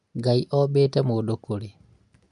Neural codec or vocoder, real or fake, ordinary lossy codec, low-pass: none; real; MP3, 64 kbps; 10.8 kHz